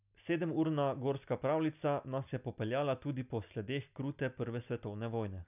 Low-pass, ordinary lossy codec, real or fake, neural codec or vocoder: 3.6 kHz; none; real; none